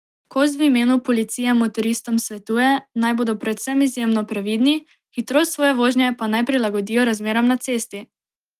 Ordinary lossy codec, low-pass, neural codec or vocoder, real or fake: Opus, 24 kbps; 14.4 kHz; none; real